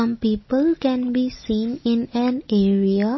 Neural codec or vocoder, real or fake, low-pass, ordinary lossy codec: none; real; 7.2 kHz; MP3, 24 kbps